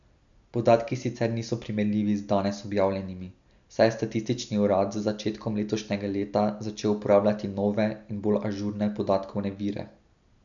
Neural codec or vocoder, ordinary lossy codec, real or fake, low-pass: none; none; real; 7.2 kHz